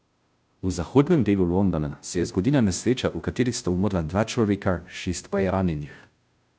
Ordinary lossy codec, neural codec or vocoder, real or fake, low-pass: none; codec, 16 kHz, 0.5 kbps, FunCodec, trained on Chinese and English, 25 frames a second; fake; none